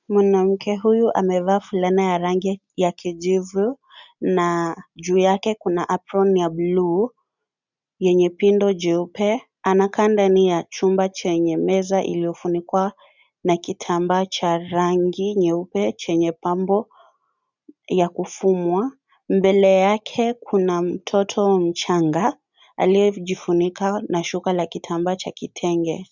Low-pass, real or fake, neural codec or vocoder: 7.2 kHz; real; none